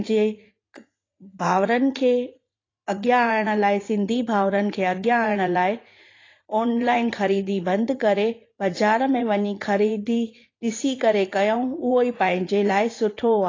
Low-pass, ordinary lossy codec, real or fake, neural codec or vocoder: 7.2 kHz; AAC, 32 kbps; fake; vocoder, 44.1 kHz, 80 mel bands, Vocos